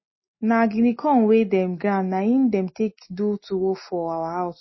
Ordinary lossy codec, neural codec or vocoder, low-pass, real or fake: MP3, 24 kbps; none; 7.2 kHz; real